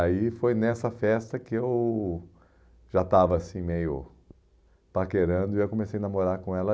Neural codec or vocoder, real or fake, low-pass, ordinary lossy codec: none; real; none; none